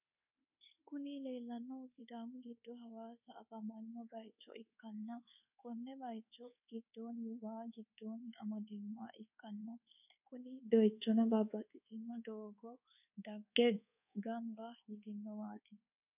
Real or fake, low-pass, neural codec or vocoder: fake; 3.6 kHz; codec, 24 kHz, 1.2 kbps, DualCodec